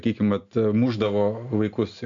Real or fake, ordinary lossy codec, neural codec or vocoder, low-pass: real; MP3, 64 kbps; none; 7.2 kHz